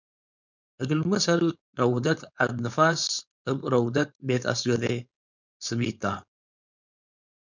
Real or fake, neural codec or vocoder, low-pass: fake; codec, 16 kHz, 4.8 kbps, FACodec; 7.2 kHz